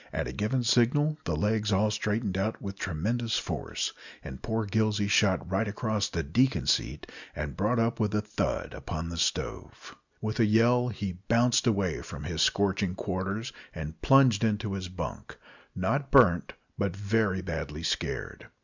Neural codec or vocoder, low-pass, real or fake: none; 7.2 kHz; real